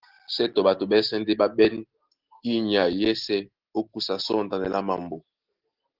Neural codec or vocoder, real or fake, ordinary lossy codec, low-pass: none; real; Opus, 16 kbps; 5.4 kHz